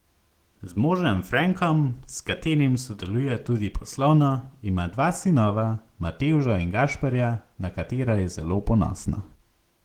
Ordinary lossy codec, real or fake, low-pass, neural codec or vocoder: Opus, 16 kbps; fake; 19.8 kHz; autoencoder, 48 kHz, 128 numbers a frame, DAC-VAE, trained on Japanese speech